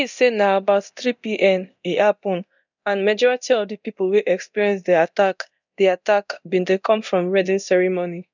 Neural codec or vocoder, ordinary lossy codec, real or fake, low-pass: codec, 24 kHz, 0.9 kbps, DualCodec; none; fake; 7.2 kHz